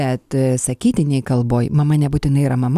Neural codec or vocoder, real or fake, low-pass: none; real; 14.4 kHz